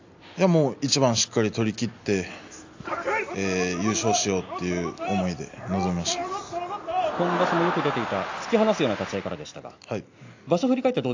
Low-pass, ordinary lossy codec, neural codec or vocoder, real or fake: 7.2 kHz; AAC, 48 kbps; none; real